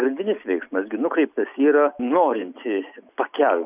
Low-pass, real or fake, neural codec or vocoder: 3.6 kHz; real; none